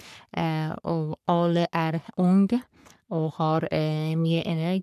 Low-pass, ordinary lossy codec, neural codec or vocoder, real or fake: 14.4 kHz; none; codec, 44.1 kHz, 3.4 kbps, Pupu-Codec; fake